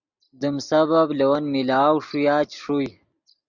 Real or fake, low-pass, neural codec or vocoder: real; 7.2 kHz; none